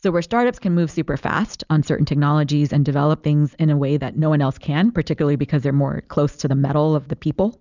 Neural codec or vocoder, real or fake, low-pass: none; real; 7.2 kHz